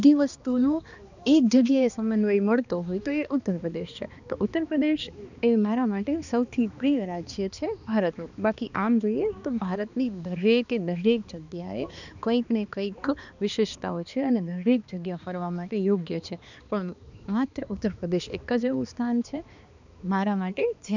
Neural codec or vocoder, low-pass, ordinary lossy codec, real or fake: codec, 16 kHz, 2 kbps, X-Codec, HuBERT features, trained on balanced general audio; 7.2 kHz; none; fake